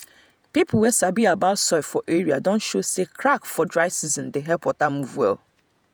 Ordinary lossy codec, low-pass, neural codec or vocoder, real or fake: none; none; vocoder, 48 kHz, 128 mel bands, Vocos; fake